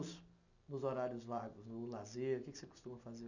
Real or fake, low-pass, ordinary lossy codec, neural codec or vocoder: real; 7.2 kHz; none; none